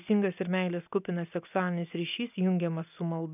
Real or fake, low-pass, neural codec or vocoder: real; 3.6 kHz; none